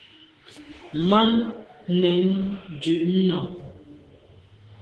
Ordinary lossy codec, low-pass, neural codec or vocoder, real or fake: Opus, 16 kbps; 10.8 kHz; autoencoder, 48 kHz, 32 numbers a frame, DAC-VAE, trained on Japanese speech; fake